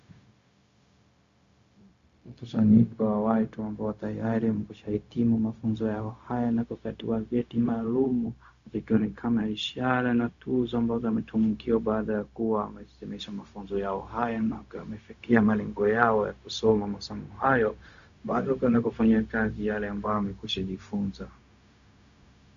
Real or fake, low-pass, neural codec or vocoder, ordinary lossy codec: fake; 7.2 kHz; codec, 16 kHz, 0.4 kbps, LongCat-Audio-Codec; Opus, 64 kbps